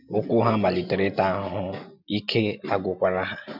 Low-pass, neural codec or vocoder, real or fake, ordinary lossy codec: 5.4 kHz; none; real; none